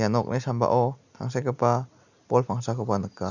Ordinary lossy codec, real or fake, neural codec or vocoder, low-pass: none; real; none; 7.2 kHz